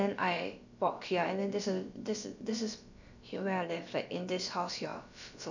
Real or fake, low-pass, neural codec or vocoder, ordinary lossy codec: fake; 7.2 kHz; codec, 16 kHz, about 1 kbps, DyCAST, with the encoder's durations; none